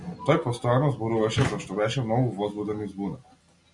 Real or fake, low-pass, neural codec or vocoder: real; 10.8 kHz; none